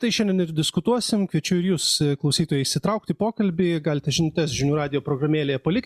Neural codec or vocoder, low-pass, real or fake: none; 14.4 kHz; real